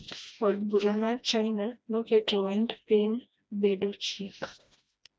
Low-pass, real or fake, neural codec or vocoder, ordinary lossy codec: none; fake; codec, 16 kHz, 1 kbps, FreqCodec, smaller model; none